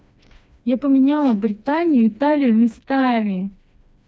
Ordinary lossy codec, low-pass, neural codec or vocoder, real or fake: none; none; codec, 16 kHz, 2 kbps, FreqCodec, smaller model; fake